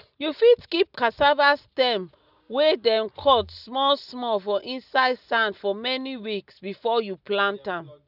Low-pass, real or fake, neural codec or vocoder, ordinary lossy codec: 5.4 kHz; real; none; none